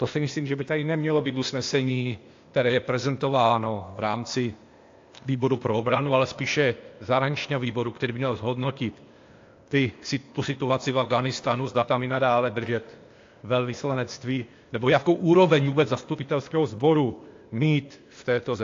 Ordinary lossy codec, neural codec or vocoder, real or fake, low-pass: AAC, 48 kbps; codec, 16 kHz, 0.8 kbps, ZipCodec; fake; 7.2 kHz